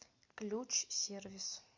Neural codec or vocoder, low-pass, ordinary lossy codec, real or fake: none; 7.2 kHz; MP3, 48 kbps; real